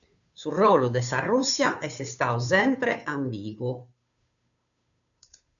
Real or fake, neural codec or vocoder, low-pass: fake; codec, 16 kHz, 2 kbps, FunCodec, trained on Chinese and English, 25 frames a second; 7.2 kHz